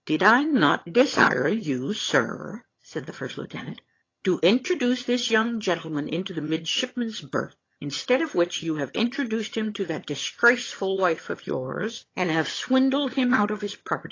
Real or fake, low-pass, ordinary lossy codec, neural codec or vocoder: fake; 7.2 kHz; AAC, 32 kbps; vocoder, 22.05 kHz, 80 mel bands, HiFi-GAN